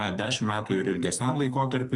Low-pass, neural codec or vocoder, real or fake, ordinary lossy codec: 10.8 kHz; codec, 44.1 kHz, 2.6 kbps, SNAC; fake; Opus, 64 kbps